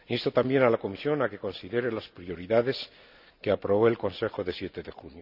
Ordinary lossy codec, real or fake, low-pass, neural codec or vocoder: none; real; 5.4 kHz; none